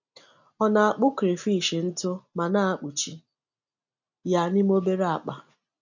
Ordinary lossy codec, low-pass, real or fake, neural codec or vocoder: none; 7.2 kHz; real; none